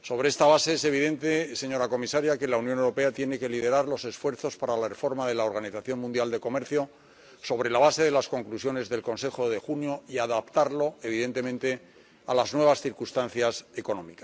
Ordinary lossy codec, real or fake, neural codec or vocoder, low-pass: none; real; none; none